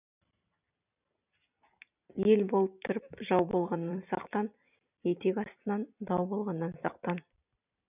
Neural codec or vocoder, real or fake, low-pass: vocoder, 44.1 kHz, 128 mel bands every 256 samples, BigVGAN v2; fake; 3.6 kHz